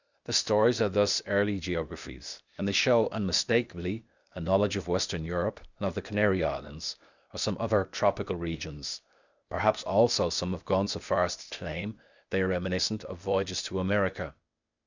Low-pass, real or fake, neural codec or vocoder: 7.2 kHz; fake; codec, 16 kHz, 0.8 kbps, ZipCodec